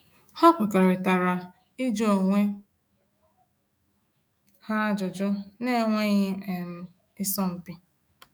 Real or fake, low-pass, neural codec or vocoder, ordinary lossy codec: fake; none; autoencoder, 48 kHz, 128 numbers a frame, DAC-VAE, trained on Japanese speech; none